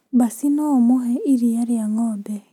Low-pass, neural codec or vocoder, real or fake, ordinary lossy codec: 19.8 kHz; none; real; none